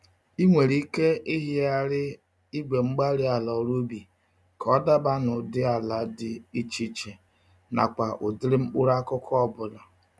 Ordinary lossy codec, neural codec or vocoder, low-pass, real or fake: none; none; none; real